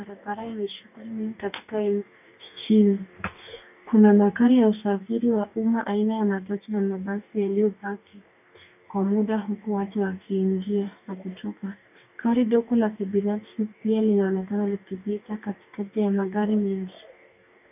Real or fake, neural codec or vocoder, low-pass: fake; codec, 44.1 kHz, 2.6 kbps, DAC; 3.6 kHz